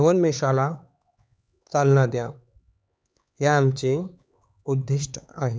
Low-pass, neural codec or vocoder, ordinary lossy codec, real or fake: none; codec, 16 kHz, 4 kbps, X-Codec, HuBERT features, trained on LibriSpeech; none; fake